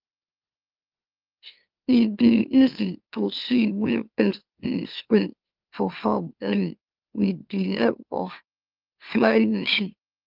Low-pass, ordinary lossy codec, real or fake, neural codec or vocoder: 5.4 kHz; Opus, 24 kbps; fake; autoencoder, 44.1 kHz, a latent of 192 numbers a frame, MeloTTS